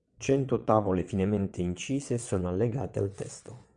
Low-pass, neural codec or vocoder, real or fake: 9.9 kHz; vocoder, 22.05 kHz, 80 mel bands, WaveNeXt; fake